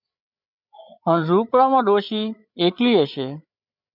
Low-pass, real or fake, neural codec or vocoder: 5.4 kHz; fake; codec, 16 kHz, 8 kbps, FreqCodec, larger model